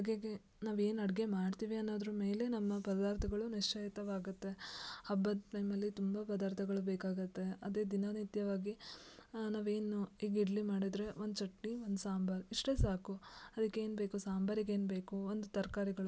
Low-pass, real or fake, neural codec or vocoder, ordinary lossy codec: none; real; none; none